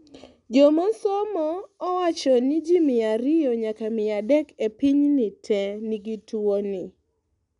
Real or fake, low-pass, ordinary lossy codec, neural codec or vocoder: real; 10.8 kHz; none; none